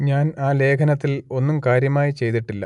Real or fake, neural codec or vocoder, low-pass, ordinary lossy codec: real; none; 10.8 kHz; none